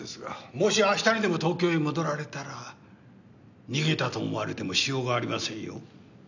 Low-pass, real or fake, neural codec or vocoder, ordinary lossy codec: 7.2 kHz; real; none; none